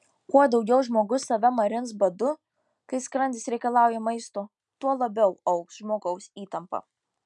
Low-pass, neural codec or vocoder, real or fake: 10.8 kHz; none; real